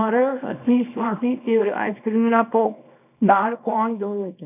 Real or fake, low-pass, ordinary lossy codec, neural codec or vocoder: fake; 3.6 kHz; none; codec, 24 kHz, 0.9 kbps, WavTokenizer, small release